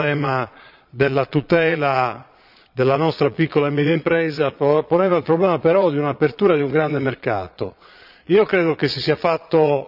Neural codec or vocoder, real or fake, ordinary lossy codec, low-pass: vocoder, 22.05 kHz, 80 mel bands, Vocos; fake; none; 5.4 kHz